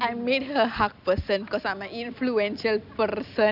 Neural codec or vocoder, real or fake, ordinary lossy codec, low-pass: none; real; none; 5.4 kHz